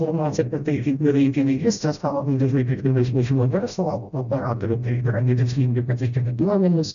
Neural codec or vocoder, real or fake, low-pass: codec, 16 kHz, 0.5 kbps, FreqCodec, smaller model; fake; 7.2 kHz